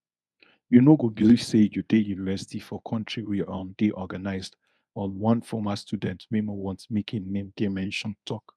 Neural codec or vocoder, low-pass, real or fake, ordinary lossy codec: codec, 24 kHz, 0.9 kbps, WavTokenizer, medium speech release version 1; none; fake; none